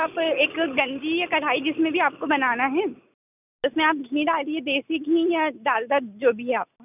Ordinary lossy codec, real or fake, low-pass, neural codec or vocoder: none; real; 3.6 kHz; none